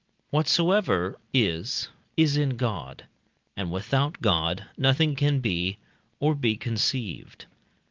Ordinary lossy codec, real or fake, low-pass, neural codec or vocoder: Opus, 24 kbps; real; 7.2 kHz; none